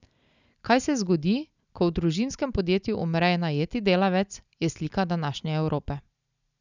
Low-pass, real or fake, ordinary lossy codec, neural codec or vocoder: 7.2 kHz; real; none; none